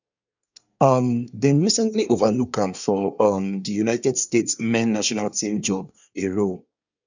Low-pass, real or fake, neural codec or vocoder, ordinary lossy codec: 7.2 kHz; fake; codec, 24 kHz, 1 kbps, SNAC; none